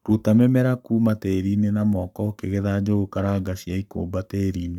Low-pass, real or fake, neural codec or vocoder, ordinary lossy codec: 19.8 kHz; fake; codec, 44.1 kHz, 7.8 kbps, Pupu-Codec; none